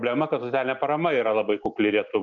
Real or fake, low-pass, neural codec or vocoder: real; 7.2 kHz; none